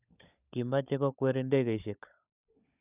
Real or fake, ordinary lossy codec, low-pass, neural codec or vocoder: fake; none; 3.6 kHz; codec, 16 kHz, 16 kbps, FunCodec, trained on LibriTTS, 50 frames a second